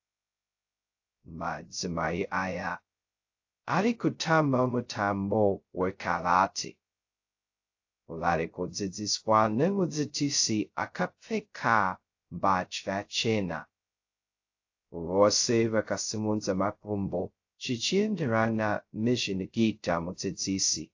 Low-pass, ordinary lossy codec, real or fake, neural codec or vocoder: 7.2 kHz; AAC, 48 kbps; fake; codec, 16 kHz, 0.2 kbps, FocalCodec